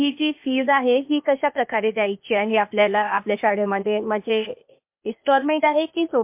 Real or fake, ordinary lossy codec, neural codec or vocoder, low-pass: fake; MP3, 32 kbps; codec, 16 kHz, 0.8 kbps, ZipCodec; 3.6 kHz